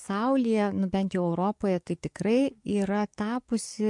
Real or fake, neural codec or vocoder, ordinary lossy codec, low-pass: fake; codec, 44.1 kHz, 7.8 kbps, DAC; MP3, 64 kbps; 10.8 kHz